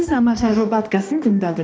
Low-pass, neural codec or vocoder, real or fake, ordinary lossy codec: none; codec, 16 kHz, 1 kbps, X-Codec, HuBERT features, trained on balanced general audio; fake; none